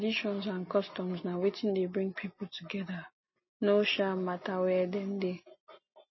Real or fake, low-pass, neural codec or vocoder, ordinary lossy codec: real; 7.2 kHz; none; MP3, 24 kbps